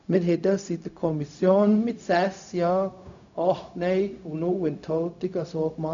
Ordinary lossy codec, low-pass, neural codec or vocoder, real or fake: none; 7.2 kHz; codec, 16 kHz, 0.4 kbps, LongCat-Audio-Codec; fake